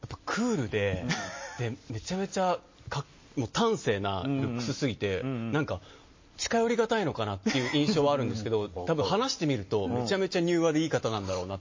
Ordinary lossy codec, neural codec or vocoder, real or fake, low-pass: MP3, 32 kbps; none; real; 7.2 kHz